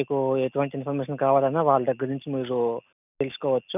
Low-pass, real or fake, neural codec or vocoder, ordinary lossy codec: 3.6 kHz; real; none; none